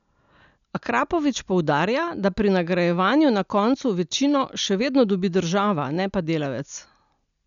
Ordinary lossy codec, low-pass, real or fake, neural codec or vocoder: none; 7.2 kHz; real; none